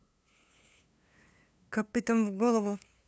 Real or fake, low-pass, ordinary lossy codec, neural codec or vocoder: fake; none; none; codec, 16 kHz, 2 kbps, FunCodec, trained on LibriTTS, 25 frames a second